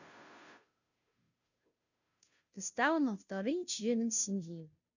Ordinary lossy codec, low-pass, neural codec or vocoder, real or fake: none; 7.2 kHz; codec, 16 kHz, 0.5 kbps, FunCodec, trained on Chinese and English, 25 frames a second; fake